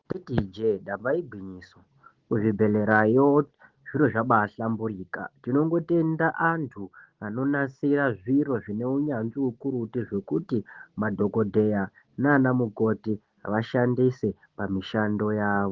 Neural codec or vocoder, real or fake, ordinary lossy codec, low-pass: none; real; Opus, 16 kbps; 7.2 kHz